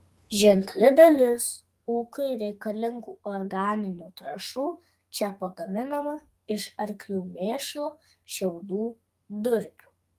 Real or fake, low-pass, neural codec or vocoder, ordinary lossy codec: fake; 14.4 kHz; codec, 32 kHz, 1.9 kbps, SNAC; Opus, 32 kbps